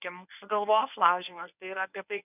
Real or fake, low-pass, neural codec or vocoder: fake; 3.6 kHz; codec, 24 kHz, 0.9 kbps, WavTokenizer, medium speech release version 1